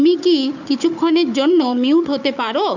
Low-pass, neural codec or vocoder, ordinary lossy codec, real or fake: 7.2 kHz; codec, 16 kHz, 4 kbps, FunCodec, trained on Chinese and English, 50 frames a second; none; fake